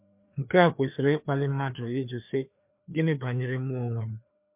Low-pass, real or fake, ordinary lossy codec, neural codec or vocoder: 3.6 kHz; fake; MP3, 32 kbps; codec, 16 kHz, 2 kbps, FreqCodec, larger model